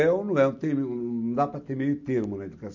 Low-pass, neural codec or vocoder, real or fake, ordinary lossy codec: 7.2 kHz; none; real; none